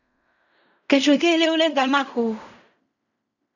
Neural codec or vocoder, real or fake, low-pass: codec, 16 kHz in and 24 kHz out, 0.4 kbps, LongCat-Audio-Codec, fine tuned four codebook decoder; fake; 7.2 kHz